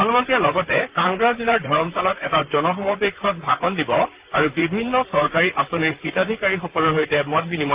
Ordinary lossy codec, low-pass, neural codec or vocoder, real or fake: Opus, 32 kbps; 3.6 kHz; vocoder, 44.1 kHz, 128 mel bands, Pupu-Vocoder; fake